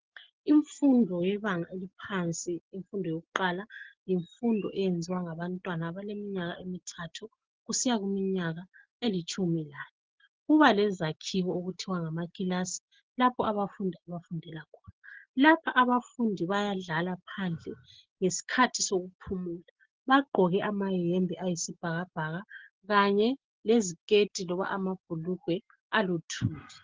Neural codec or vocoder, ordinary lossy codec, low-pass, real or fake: none; Opus, 16 kbps; 7.2 kHz; real